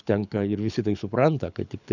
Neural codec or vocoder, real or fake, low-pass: codec, 24 kHz, 6 kbps, HILCodec; fake; 7.2 kHz